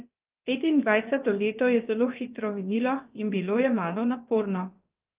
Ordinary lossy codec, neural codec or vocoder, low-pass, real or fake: Opus, 24 kbps; codec, 16 kHz, about 1 kbps, DyCAST, with the encoder's durations; 3.6 kHz; fake